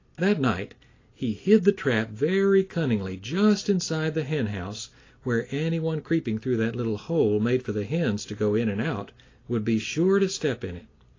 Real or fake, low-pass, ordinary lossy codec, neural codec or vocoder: real; 7.2 kHz; AAC, 32 kbps; none